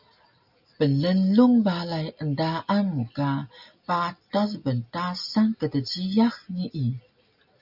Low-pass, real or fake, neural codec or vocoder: 5.4 kHz; real; none